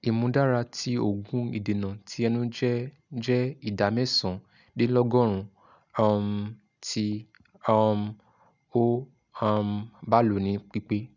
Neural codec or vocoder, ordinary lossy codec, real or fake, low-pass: none; none; real; 7.2 kHz